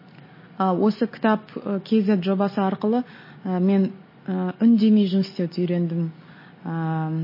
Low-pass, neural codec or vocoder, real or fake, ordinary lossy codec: 5.4 kHz; none; real; MP3, 24 kbps